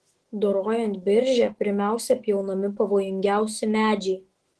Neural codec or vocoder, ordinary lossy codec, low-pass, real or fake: autoencoder, 48 kHz, 128 numbers a frame, DAC-VAE, trained on Japanese speech; Opus, 16 kbps; 10.8 kHz; fake